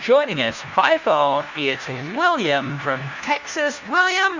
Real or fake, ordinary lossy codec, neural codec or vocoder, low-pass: fake; Opus, 64 kbps; codec, 16 kHz, 1 kbps, FunCodec, trained on LibriTTS, 50 frames a second; 7.2 kHz